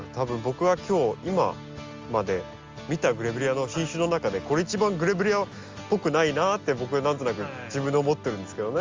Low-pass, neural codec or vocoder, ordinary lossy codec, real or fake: 7.2 kHz; none; Opus, 32 kbps; real